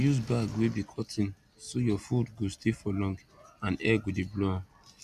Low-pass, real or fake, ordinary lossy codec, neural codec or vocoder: 14.4 kHz; real; none; none